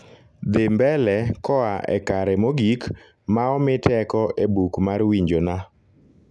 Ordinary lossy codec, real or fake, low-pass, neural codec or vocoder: none; real; none; none